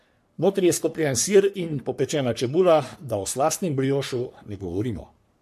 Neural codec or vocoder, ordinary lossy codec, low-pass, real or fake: codec, 44.1 kHz, 3.4 kbps, Pupu-Codec; MP3, 64 kbps; 14.4 kHz; fake